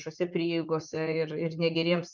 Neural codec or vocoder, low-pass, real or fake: vocoder, 44.1 kHz, 80 mel bands, Vocos; 7.2 kHz; fake